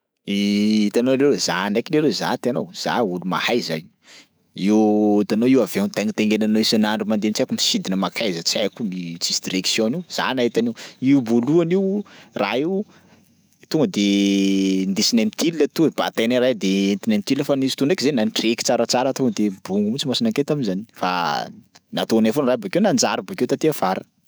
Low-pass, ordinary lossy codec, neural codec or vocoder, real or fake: none; none; autoencoder, 48 kHz, 128 numbers a frame, DAC-VAE, trained on Japanese speech; fake